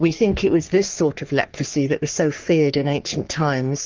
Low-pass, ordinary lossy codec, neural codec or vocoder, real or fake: 7.2 kHz; Opus, 32 kbps; codec, 44.1 kHz, 3.4 kbps, Pupu-Codec; fake